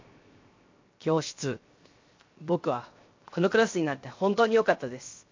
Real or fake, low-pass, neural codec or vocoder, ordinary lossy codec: fake; 7.2 kHz; codec, 16 kHz, 0.7 kbps, FocalCodec; MP3, 48 kbps